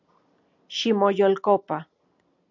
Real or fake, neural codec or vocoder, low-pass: real; none; 7.2 kHz